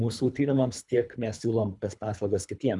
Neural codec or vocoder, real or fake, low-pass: codec, 24 kHz, 3 kbps, HILCodec; fake; 10.8 kHz